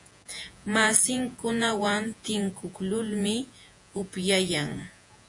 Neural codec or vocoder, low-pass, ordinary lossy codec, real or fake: vocoder, 48 kHz, 128 mel bands, Vocos; 10.8 kHz; AAC, 64 kbps; fake